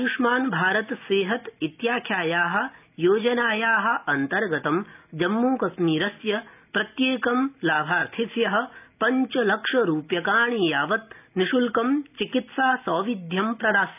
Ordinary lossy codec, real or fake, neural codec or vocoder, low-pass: none; real; none; 3.6 kHz